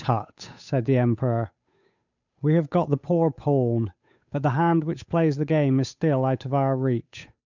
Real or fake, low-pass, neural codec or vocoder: fake; 7.2 kHz; codec, 16 kHz, 8 kbps, FunCodec, trained on Chinese and English, 25 frames a second